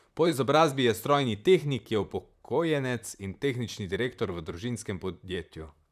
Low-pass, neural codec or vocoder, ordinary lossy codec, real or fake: 14.4 kHz; vocoder, 44.1 kHz, 128 mel bands, Pupu-Vocoder; none; fake